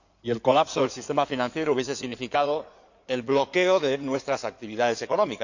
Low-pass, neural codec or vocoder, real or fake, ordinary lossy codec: 7.2 kHz; codec, 16 kHz in and 24 kHz out, 2.2 kbps, FireRedTTS-2 codec; fake; none